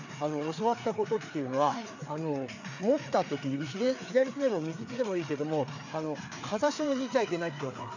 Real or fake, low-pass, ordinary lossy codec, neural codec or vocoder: fake; 7.2 kHz; none; codec, 16 kHz, 4 kbps, FreqCodec, larger model